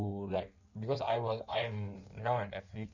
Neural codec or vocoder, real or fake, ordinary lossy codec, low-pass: codec, 16 kHz in and 24 kHz out, 1.1 kbps, FireRedTTS-2 codec; fake; none; 7.2 kHz